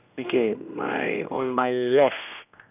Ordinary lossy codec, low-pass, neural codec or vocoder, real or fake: none; 3.6 kHz; codec, 16 kHz, 1 kbps, X-Codec, HuBERT features, trained on general audio; fake